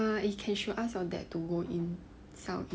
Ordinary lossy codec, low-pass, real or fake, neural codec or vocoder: none; none; real; none